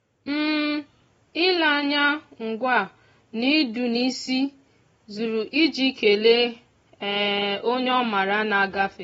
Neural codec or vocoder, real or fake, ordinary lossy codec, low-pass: none; real; AAC, 24 kbps; 19.8 kHz